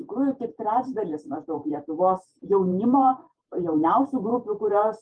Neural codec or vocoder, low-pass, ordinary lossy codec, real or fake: vocoder, 48 kHz, 128 mel bands, Vocos; 9.9 kHz; Opus, 32 kbps; fake